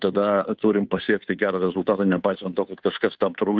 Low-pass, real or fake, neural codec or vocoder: 7.2 kHz; fake; codec, 16 kHz, 2 kbps, FunCodec, trained on Chinese and English, 25 frames a second